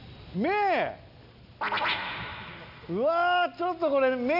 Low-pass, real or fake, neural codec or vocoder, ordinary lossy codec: 5.4 kHz; real; none; none